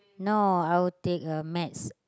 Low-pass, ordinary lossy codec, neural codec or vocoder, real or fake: none; none; none; real